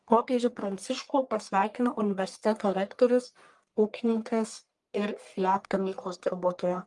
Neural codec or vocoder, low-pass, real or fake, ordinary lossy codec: codec, 44.1 kHz, 1.7 kbps, Pupu-Codec; 10.8 kHz; fake; Opus, 32 kbps